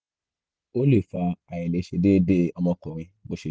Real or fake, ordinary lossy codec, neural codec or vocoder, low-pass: real; none; none; none